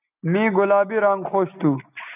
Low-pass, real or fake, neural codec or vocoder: 3.6 kHz; real; none